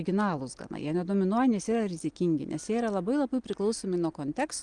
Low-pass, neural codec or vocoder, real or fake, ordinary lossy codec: 9.9 kHz; none; real; Opus, 24 kbps